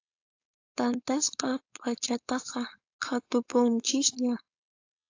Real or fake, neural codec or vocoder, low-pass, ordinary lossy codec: fake; codec, 16 kHz, 4.8 kbps, FACodec; 7.2 kHz; AAC, 48 kbps